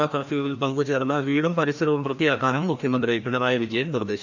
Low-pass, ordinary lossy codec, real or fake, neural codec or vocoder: 7.2 kHz; none; fake; codec, 16 kHz, 1 kbps, FreqCodec, larger model